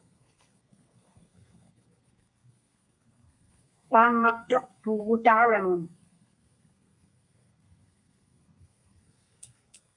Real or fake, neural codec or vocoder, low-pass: fake; codec, 32 kHz, 1.9 kbps, SNAC; 10.8 kHz